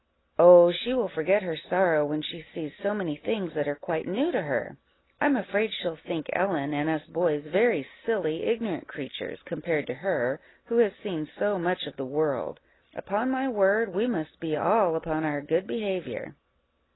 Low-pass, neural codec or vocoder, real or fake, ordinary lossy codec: 7.2 kHz; none; real; AAC, 16 kbps